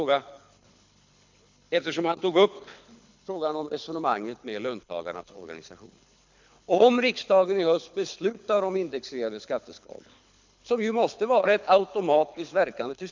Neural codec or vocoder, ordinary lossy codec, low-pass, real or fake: codec, 24 kHz, 6 kbps, HILCodec; MP3, 64 kbps; 7.2 kHz; fake